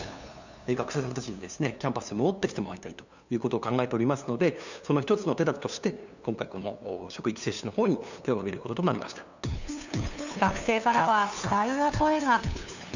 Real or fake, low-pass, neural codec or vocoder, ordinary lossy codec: fake; 7.2 kHz; codec, 16 kHz, 2 kbps, FunCodec, trained on LibriTTS, 25 frames a second; none